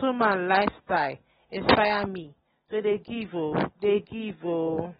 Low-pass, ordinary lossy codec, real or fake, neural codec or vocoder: 19.8 kHz; AAC, 16 kbps; real; none